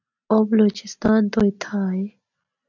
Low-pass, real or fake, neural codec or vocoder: 7.2 kHz; real; none